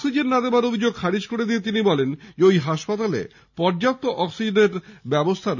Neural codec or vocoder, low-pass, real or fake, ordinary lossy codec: none; 7.2 kHz; real; none